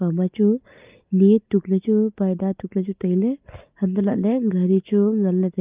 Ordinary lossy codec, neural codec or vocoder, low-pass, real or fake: Opus, 24 kbps; none; 3.6 kHz; real